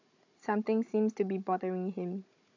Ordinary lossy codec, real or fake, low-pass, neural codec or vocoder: AAC, 48 kbps; fake; 7.2 kHz; codec, 16 kHz, 16 kbps, FunCodec, trained on Chinese and English, 50 frames a second